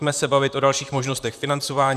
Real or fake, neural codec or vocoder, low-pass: fake; vocoder, 44.1 kHz, 128 mel bands, Pupu-Vocoder; 14.4 kHz